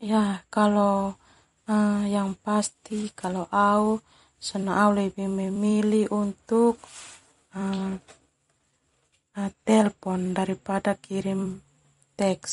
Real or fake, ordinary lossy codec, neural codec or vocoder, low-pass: fake; MP3, 48 kbps; vocoder, 44.1 kHz, 128 mel bands every 256 samples, BigVGAN v2; 19.8 kHz